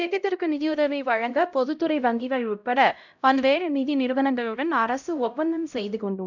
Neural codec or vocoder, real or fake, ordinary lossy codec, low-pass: codec, 16 kHz, 0.5 kbps, X-Codec, HuBERT features, trained on LibriSpeech; fake; none; 7.2 kHz